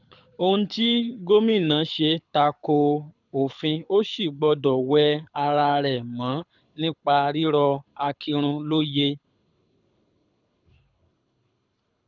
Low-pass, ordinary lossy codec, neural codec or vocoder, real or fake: 7.2 kHz; none; codec, 24 kHz, 6 kbps, HILCodec; fake